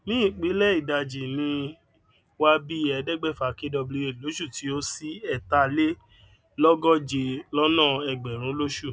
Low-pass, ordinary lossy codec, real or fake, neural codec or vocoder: none; none; real; none